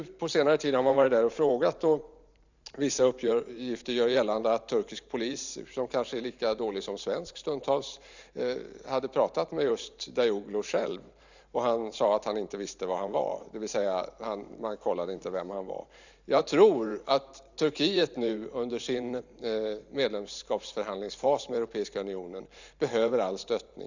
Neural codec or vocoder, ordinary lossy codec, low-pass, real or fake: vocoder, 44.1 kHz, 128 mel bands every 512 samples, BigVGAN v2; none; 7.2 kHz; fake